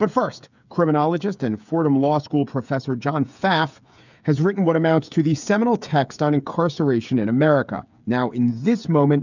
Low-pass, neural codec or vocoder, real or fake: 7.2 kHz; codec, 16 kHz, 8 kbps, FreqCodec, smaller model; fake